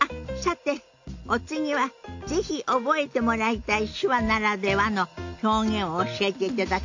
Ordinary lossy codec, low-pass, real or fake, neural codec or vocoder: AAC, 48 kbps; 7.2 kHz; real; none